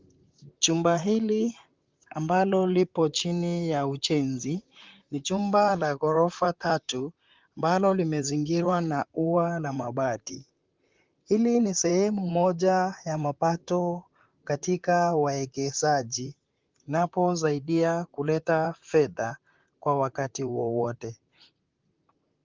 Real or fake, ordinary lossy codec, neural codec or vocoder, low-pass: fake; Opus, 32 kbps; vocoder, 44.1 kHz, 128 mel bands, Pupu-Vocoder; 7.2 kHz